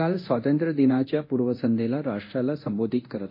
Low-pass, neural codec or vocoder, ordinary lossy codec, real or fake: 5.4 kHz; codec, 24 kHz, 0.9 kbps, DualCodec; MP3, 32 kbps; fake